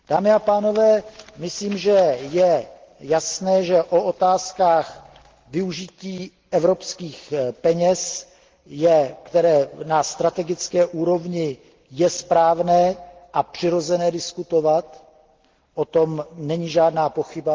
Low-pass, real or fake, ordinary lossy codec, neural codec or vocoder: 7.2 kHz; real; Opus, 16 kbps; none